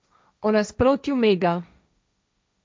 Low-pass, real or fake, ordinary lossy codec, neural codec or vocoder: none; fake; none; codec, 16 kHz, 1.1 kbps, Voila-Tokenizer